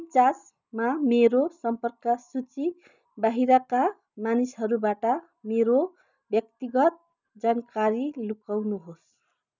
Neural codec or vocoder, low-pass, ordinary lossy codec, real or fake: none; 7.2 kHz; none; real